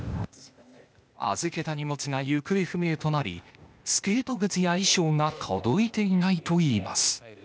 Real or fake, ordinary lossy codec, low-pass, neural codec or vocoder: fake; none; none; codec, 16 kHz, 0.8 kbps, ZipCodec